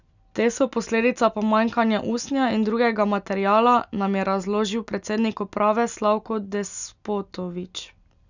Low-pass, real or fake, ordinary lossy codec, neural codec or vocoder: 7.2 kHz; real; none; none